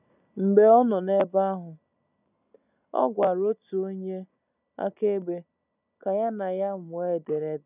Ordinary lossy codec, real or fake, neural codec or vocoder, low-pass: none; real; none; 3.6 kHz